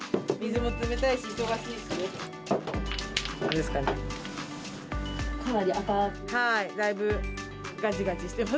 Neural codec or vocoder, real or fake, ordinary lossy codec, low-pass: none; real; none; none